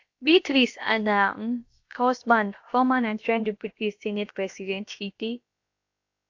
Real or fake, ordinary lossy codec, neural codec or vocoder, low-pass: fake; AAC, 48 kbps; codec, 16 kHz, about 1 kbps, DyCAST, with the encoder's durations; 7.2 kHz